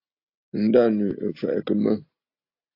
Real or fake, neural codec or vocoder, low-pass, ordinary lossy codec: real; none; 5.4 kHz; AAC, 32 kbps